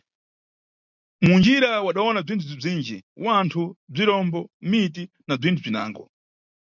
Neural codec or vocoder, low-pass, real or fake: none; 7.2 kHz; real